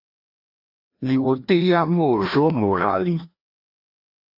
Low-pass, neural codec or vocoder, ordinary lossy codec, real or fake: 5.4 kHz; codec, 16 kHz, 1 kbps, FreqCodec, larger model; AAC, 32 kbps; fake